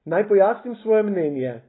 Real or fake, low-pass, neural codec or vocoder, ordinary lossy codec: real; 7.2 kHz; none; AAC, 16 kbps